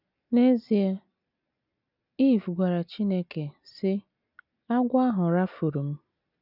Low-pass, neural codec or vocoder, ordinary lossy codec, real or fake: 5.4 kHz; none; none; real